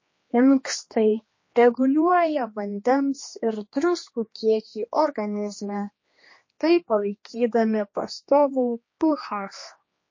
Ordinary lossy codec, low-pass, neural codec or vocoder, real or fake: MP3, 32 kbps; 7.2 kHz; codec, 16 kHz, 2 kbps, X-Codec, HuBERT features, trained on general audio; fake